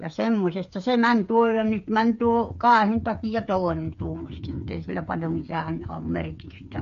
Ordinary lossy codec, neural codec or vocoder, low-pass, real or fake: MP3, 48 kbps; codec, 16 kHz, 8 kbps, FreqCodec, smaller model; 7.2 kHz; fake